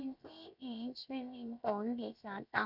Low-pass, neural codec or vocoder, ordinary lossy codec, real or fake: 5.4 kHz; codec, 16 kHz, 0.7 kbps, FocalCodec; none; fake